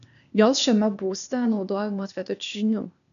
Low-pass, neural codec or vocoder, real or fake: 7.2 kHz; codec, 16 kHz, 0.8 kbps, ZipCodec; fake